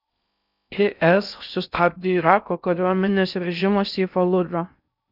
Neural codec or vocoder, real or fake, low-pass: codec, 16 kHz in and 24 kHz out, 0.6 kbps, FocalCodec, streaming, 4096 codes; fake; 5.4 kHz